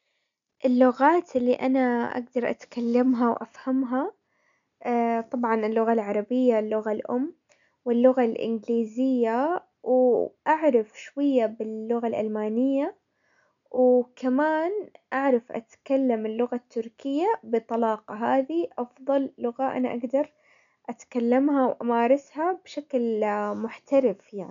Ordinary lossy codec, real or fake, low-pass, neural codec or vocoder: MP3, 96 kbps; real; 7.2 kHz; none